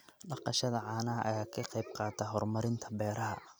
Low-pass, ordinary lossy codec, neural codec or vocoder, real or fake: none; none; none; real